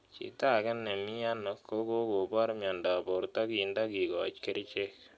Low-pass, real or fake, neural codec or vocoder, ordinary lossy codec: none; real; none; none